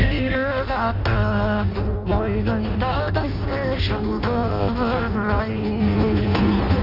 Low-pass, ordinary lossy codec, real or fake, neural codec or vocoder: 5.4 kHz; none; fake; codec, 16 kHz in and 24 kHz out, 0.6 kbps, FireRedTTS-2 codec